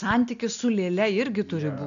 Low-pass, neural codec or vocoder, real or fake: 7.2 kHz; none; real